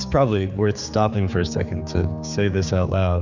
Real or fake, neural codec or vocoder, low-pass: fake; codec, 16 kHz, 4 kbps, X-Codec, HuBERT features, trained on general audio; 7.2 kHz